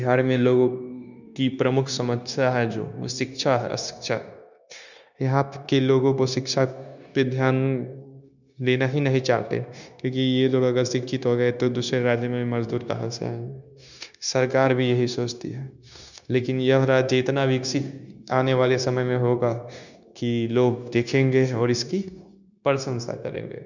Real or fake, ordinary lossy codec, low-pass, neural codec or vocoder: fake; none; 7.2 kHz; codec, 16 kHz, 0.9 kbps, LongCat-Audio-Codec